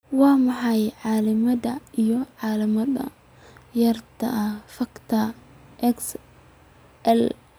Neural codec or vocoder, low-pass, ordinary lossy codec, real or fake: none; none; none; real